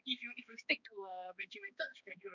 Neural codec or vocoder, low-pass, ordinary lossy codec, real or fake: codec, 16 kHz, 2 kbps, X-Codec, HuBERT features, trained on balanced general audio; 7.2 kHz; none; fake